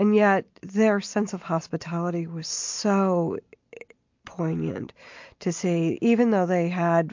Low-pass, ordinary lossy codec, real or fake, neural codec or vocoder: 7.2 kHz; MP3, 48 kbps; real; none